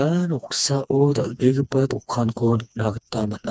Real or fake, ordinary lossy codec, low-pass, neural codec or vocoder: fake; none; none; codec, 16 kHz, 2 kbps, FreqCodec, smaller model